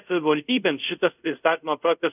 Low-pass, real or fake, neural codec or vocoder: 3.6 kHz; fake; codec, 24 kHz, 0.5 kbps, DualCodec